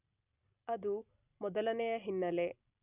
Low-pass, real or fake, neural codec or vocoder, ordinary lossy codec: 3.6 kHz; real; none; none